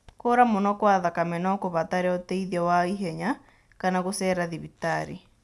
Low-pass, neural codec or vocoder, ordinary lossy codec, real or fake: none; none; none; real